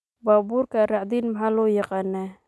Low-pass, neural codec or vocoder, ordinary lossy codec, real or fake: none; none; none; real